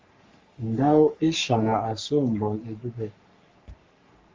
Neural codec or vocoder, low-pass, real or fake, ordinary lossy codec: codec, 44.1 kHz, 3.4 kbps, Pupu-Codec; 7.2 kHz; fake; Opus, 32 kbps